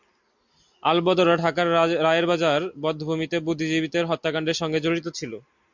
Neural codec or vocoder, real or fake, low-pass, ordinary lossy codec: none; real; 7.2 kHz; MP3, 64 kbps